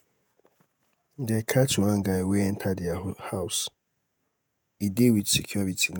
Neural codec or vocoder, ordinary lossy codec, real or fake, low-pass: vocoder, 48 kHz, 128 mel bands, Vocos; none; fake; none